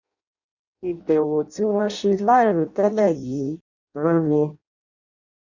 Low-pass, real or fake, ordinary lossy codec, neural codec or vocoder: 7.2 kHz; fake; none; codec, 16 kHz in and 24 kHz out, 0.6 kbps, FireRedTTS-2 codec